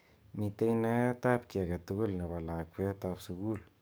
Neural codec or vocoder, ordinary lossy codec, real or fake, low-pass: codec, 44.1 kHz, 7.8 kbps, DAC; none; fake; none